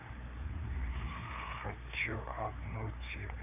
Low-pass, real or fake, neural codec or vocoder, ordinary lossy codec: 3.6 kHz; fake; codec, 16 kHz, 4 kbps, FreqCodec, larger model; none